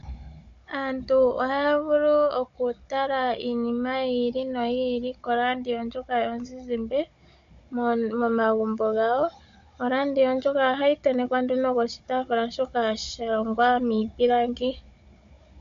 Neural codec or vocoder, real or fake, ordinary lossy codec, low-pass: codec, 16 kHz, 16 kbps, FunCodec, trained on Chinese and English, 50 frames a second; fake; MP3, 48 kbps; 7.2 kHz